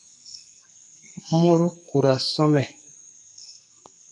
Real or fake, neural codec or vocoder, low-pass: fake; codec, 44.1 kHz, 2.6 kbps, SNAC; 10.8 kHz